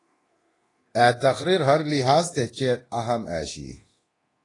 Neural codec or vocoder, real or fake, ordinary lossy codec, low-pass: codec, 24 kHz, 0.9 kbps, DualCodec; fake; AAC, 32 kbps; 10.8 kHz